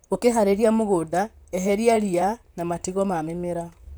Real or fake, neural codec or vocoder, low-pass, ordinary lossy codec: fake; vocoder, 44.1 kHz, 128 mel bands, Pupu-Vocoder; none; none